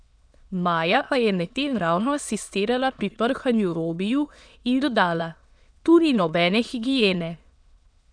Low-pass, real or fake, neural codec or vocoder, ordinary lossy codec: 9.9 kHz; fake; autoencoder, 22.05 kHz, a latent of 192 numbers a frame, VITS, trained on many speakers; none